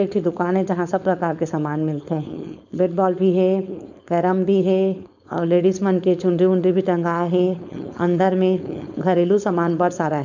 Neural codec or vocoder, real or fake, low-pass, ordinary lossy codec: codec, 16 kHz, 4.8 kbps, FACodec; fake; 7.2 kHz; none